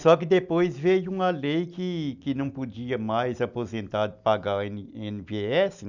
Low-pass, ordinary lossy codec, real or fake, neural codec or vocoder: 7.2 kHz; none; real; none